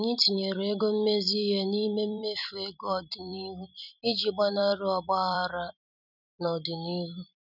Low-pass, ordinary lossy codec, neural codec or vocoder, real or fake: 5.4 kHz; none; vocoder, 44.1 kHz, 128 mel bands every 256 samples, BigVGAN v2; fake